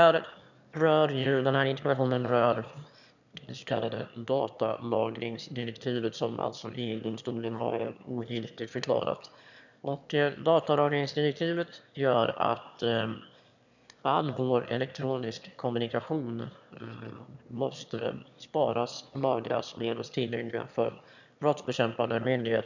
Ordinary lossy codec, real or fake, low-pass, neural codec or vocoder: none; fake; 7.2 kHz; autoencoder, 22.05 kHz, a latent of 192 numbers a frame, VITS, trained on one speaker